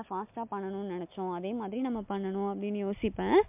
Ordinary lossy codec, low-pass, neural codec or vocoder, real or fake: MP3, 32 kbps; 3.6 kHz; none; real